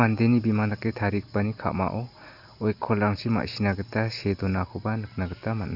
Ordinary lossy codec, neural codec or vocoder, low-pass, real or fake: Opus, 64 kbps; none; 5.4 kHz; real